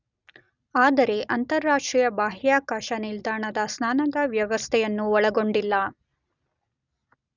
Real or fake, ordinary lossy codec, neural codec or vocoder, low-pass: real; none; none; 7.2 kHz